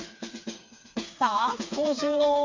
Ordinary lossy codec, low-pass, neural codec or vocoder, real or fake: MP3, 48 kbps; 7.2 kHz; codec, 16 kHz, 4 kbps, FreqCodec, smaller model; fake